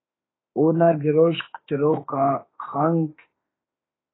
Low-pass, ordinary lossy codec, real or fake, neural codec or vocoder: 7.2 kHz; AAC, 16 kbps; fake; autoencoder, 48 kHz, 32 numbers a frame, DAC-VAE, trained on Japanese speech